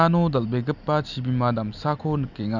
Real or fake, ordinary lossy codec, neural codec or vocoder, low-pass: real; none; none; 7.2 kHz